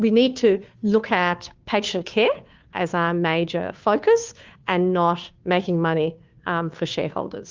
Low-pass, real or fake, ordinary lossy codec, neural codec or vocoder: 7.2 kHz; fake; Opus, 32 kbps; codec, 16 kHz, 2 kbps, FunCodec, trained on Chinese and English, 25 frames a second